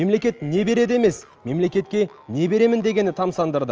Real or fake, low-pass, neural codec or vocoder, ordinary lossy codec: real; 7.2 kHz; none; Opus, 24 kbps